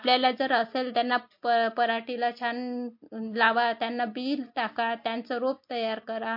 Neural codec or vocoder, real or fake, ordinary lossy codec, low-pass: none; real; MP3, 32 kbps; 5.4 kHz